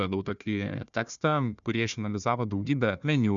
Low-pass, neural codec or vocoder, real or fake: 7.2 kHz; codec, 16 kHz, 1 kbps, FunCodec, trained on Chinese and English, 50 frames a second; fake